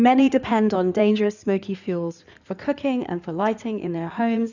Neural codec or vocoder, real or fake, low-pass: codec, 16 kHz in and 24 kHz out, 2.2 kbps, FireRedTTS-2 codec; fake; 7.2 kHz